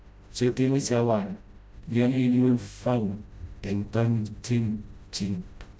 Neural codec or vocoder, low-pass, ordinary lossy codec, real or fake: codec, 16 kHz, 0.5 kbps, FreqCodec, smaller model; none; none; fake